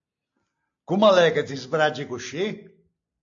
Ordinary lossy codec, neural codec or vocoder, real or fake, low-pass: AAC, 48 kbps; none; real; 7.2 kHz